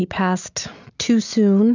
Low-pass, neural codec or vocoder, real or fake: 7.2 kHz; none; real